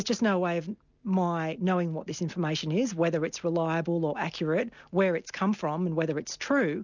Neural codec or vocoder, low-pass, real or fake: none; 7.2 kHz; real